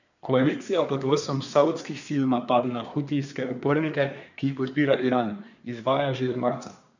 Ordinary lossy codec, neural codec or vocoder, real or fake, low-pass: none; codec, 24 kHz, 1 kbps, SNAC; fake; 7.2 kHz